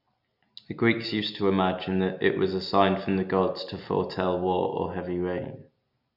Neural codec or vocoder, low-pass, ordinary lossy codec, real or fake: none; 5.4 kHz; none; real